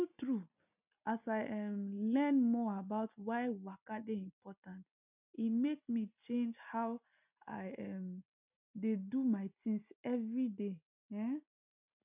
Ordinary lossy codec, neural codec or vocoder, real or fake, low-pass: none; none; real; 3.6 kHz